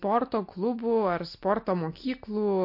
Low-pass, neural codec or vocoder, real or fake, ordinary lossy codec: 5.4 kHz; none; real; MP3, 32 kbps